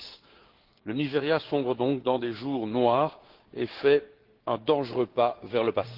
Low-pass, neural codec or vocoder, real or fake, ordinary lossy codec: 5.4 kHz; codec, 16 kHz, 6 kbps, DAC; fake; Opus, 16 kbps